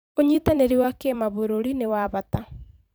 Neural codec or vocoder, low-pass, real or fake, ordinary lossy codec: vocoder, 44.1 kHz, 128 mel bands every 256 samples, BigVGAN v2; none; fake; none